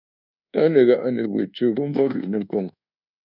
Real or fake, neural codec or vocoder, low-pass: fake; codec, 24 kHz, 1.2 kbps, DualCodec; 5.4 kHz